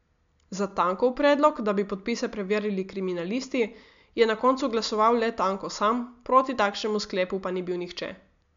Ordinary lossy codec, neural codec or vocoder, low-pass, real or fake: MP3, 64 kbps; none; 7.2 kHz; real